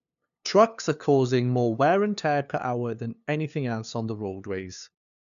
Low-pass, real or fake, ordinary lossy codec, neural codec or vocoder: 7.2 kHz; fake; none; codec, 16 kHz, 2 kbps, FunCodec, trained on LibriTTS, 25 frames a second